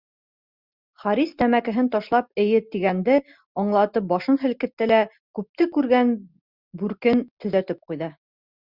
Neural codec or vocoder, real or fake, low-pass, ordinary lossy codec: none; real; 5.4 kHz; Opus, 64 kbps